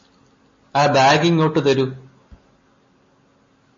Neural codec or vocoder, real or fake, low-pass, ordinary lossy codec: none; real; 7.2 kHz; MP3, 32 kbps